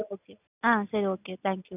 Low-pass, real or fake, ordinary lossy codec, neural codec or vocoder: 3.6 kHz; real; none; none